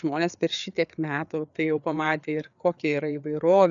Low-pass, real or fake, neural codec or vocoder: 7.2 kHz; fake; codec, 16 kHz, 4 kbps, FreqCodec, larger model